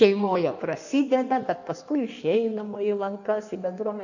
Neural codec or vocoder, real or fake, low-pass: codec, 16 kHz in and 24 kHz out, 1.1 kbps, FireRedTTS-2 codec; fake; 7.2 kHz